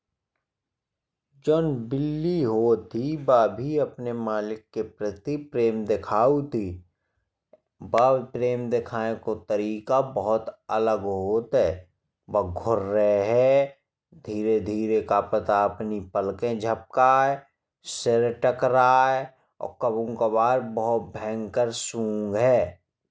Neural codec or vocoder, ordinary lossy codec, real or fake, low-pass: none; none; real; none